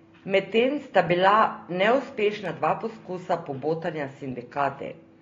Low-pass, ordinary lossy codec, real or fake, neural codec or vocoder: 7.2 kHz; AAC, 32 kbps; real; none